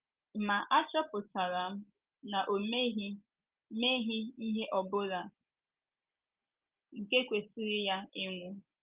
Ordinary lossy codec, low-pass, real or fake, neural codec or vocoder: Opus, 32 kbps; 3.6 kHz; real; none